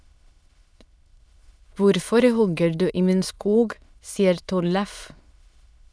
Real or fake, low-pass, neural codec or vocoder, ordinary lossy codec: fake; none; autoencoder, 22.05 kHz, a latent of 192 numbers a frame, VITS, trained on many speakers; none